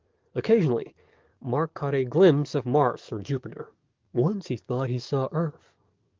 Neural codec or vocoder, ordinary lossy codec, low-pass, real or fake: codec, 44.1 kHz, 7.8 kbps, DAC; Opus, 32 kbps; 7.2 kHz; fake